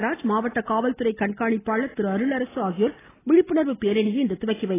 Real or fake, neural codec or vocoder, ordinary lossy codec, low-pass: real; none; AAC, 16 kbps; 3.6 kHz